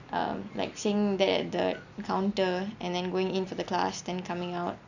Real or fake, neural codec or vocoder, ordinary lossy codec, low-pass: real; none; none; 7.2 kHz